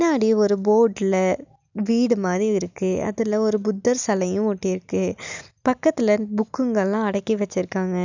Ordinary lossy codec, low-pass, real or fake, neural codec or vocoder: none; 7.2 kHz; real; none